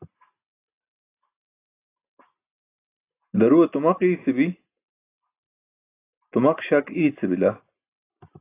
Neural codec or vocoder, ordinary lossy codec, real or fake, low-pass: none; AAC, 24 kbps; real; 3.6 kHz